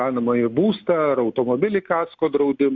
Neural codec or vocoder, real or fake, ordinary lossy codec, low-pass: none; real; MP3, 64 kbps; 7.2 kHz